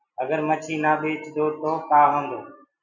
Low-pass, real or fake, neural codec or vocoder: 7.2 kHz; real; none